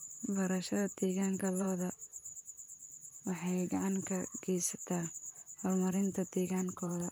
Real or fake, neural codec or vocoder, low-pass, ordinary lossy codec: fake; vocoder, 44.1 kHz, 128 mel bands, Pupu-Vocoder; none; none